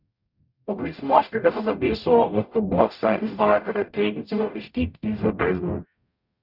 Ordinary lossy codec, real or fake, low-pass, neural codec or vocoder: none; fake; 5.4 kHz; codec, 44.1 kHz, 0.9 kbps, DAC